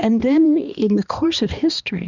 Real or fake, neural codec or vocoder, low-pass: fake; codec, 16 kHz, 2 kbps, X-Codec, HuBERT features, trained on balanced general audio; 7.2 kHz